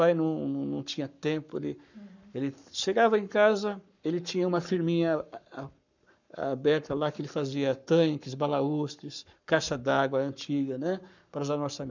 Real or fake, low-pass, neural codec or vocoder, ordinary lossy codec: fake; 7.2 kHz; codec, 44.1 kHz, 7.8 kbps, Pupu-Codec; none